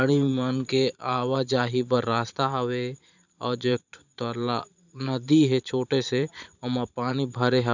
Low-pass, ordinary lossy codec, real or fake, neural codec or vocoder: 7.2 kHz; none; fake; vocoder, 44.1 kHz, 128 mel bands every 512 samples, BigVGAN v2